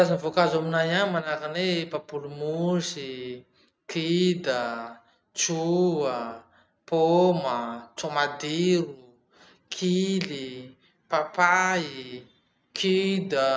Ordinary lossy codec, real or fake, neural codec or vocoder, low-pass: none; real; none; none